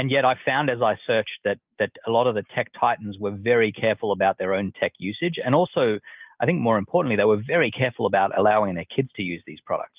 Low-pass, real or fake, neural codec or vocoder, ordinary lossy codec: 3.6 kHz; real; none; Opus, 32 kbps